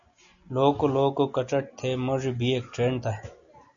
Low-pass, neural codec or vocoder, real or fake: 7.2 kHz; none; real